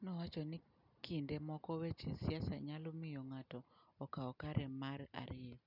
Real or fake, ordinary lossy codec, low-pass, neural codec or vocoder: real; none; 5.4 kHz; none